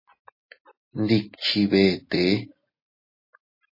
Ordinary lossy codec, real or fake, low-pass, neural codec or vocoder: MP3, 24 kbps; real; 5.4 kHz; none